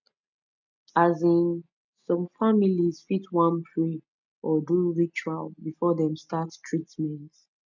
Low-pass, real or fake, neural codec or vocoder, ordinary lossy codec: 7.2 kHz; real; none; none